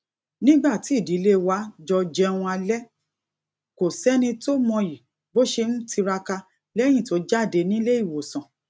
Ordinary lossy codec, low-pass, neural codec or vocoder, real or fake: none; none; none; real